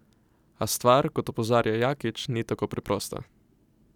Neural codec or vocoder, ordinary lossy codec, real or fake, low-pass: none; none; real; 19.8 kHz